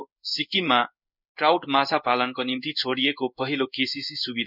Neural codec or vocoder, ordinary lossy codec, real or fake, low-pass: codec, 16 kHz in and 24 kHz out, 1 kbps, XY-Tokenizer; none; fake; 5.4 kHz